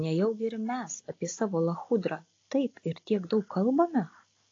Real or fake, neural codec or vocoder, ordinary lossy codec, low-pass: real; none; AAC, 32 kbps; 7.2 kHz